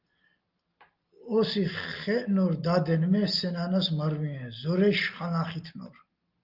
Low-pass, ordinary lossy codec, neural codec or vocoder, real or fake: 5.4 kHz; Opus, 32 kbps; none; real